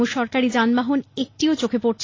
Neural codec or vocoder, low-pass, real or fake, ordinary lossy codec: none; 7.2 kHz; real; AAC, 32 kbps